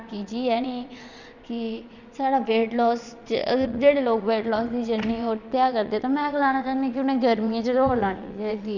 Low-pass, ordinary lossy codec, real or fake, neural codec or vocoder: 7.2 kHz; none; fake; vocoder, 22.05 kHz, 80 mel bands, Vocos